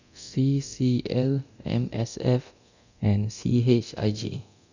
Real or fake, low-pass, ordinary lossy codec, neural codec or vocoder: fake; 7.2 kHz; none; codec, 24 kHz, 0.9 kbps, DualCodec